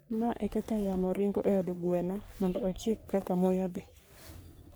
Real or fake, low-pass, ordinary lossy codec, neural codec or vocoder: fake; none; none; codec, 44.1 kHz, 3.4 kbps, Pupu-Codec